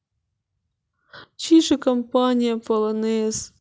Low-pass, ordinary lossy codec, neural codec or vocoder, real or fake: none; none; none; real